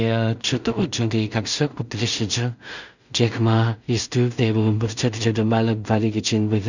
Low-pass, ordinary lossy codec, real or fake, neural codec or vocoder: 7.2 kHz; none; fake; codec, 16 kHz in and 24 kHz out, 0.4 kbps, LongCat-Audio-Codec, two codebook decoder